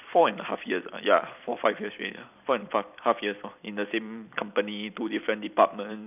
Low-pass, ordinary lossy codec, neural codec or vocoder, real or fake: 3.6 kHz; none; vocoder, 44.1 kHz, 128 mel bands every 256 samples, BigVGAN v2; fake